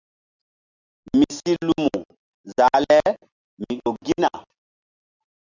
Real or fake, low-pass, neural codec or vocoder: real; 7.2 kHz; none